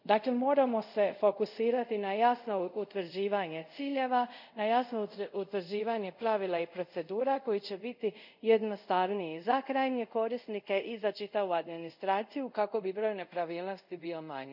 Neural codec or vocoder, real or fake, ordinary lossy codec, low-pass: codec, 24 kHz, 0.5 kbps, DualCodec; fake; none; 5.4 kHz